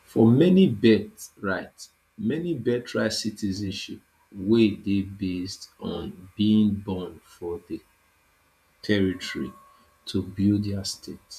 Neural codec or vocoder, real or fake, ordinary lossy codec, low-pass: vocoder, 48 kHz, 128 mel bands, Vocos; fake; none; 14.4 kHz